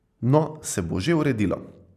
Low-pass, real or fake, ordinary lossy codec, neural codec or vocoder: 14.4 kHz; real; none; none